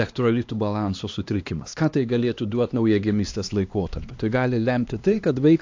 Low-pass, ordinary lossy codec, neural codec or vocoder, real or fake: 7.2 kHz; AAC, 48 kbps; codec, 16 kHz, 2 kbps, X-Codec, HuBERT features, trained on LibriSpeech; fake